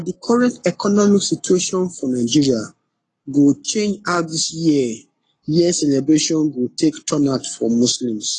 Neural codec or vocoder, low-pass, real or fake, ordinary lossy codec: codec, 44.1 kHz, 7.8 kbps, Pupu-Codec; 10.8 kHz; fake; AAC, 32 kbps